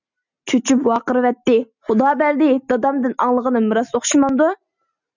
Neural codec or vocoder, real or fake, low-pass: none; real; 7.2 kHz